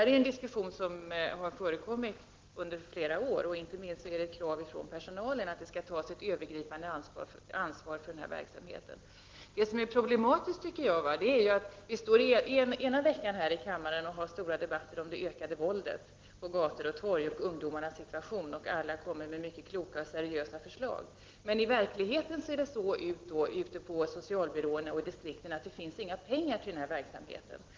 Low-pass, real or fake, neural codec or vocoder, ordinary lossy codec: 7.2 kHz; real; none; Opus, 16 kbps